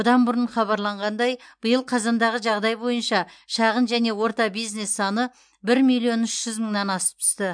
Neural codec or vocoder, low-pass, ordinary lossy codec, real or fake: none; 9.9 kHz; MP3, 64 kbps; real